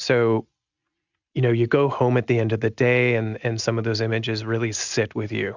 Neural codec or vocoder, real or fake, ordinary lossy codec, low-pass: none; real; Opus, 64 kbps; 7.2 kHz